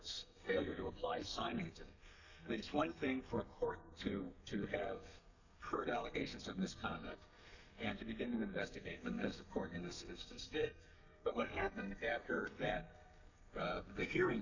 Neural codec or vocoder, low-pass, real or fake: codec, 32 kHz, 1.9 kbps, SNAC; 7.2 kHz; fake